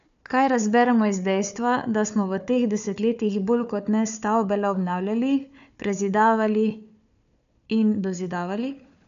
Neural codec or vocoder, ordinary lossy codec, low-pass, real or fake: codec, 16 kHz, 4 kbps, FunCodec, trained on Chinese and English, 50 frames a second; AAC, 96 kbps; 7.2 kHz; fake